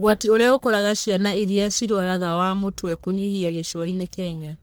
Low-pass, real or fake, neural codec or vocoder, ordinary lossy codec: none; fake; codec, 44.1 kHz, 1.7 kbps, Pupu-Codec; none